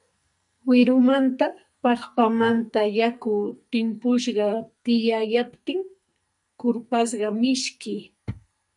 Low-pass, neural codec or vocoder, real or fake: 10.8 kHz; codec, 44.1 kHz, 2.6 kbps, SNAC; fake